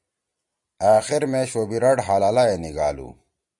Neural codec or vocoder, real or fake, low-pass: none; real; 10.8 kHz